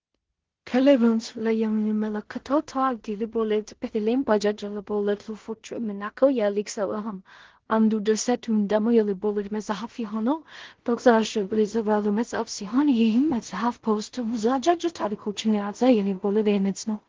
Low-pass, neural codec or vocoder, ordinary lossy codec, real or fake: 7.2 kHz; codec, 16 kHz in and 24 kHz out, 0.4 kbps, LongCat-Audio-Codec, two codebook decoder; Opus, 16 kbps; fake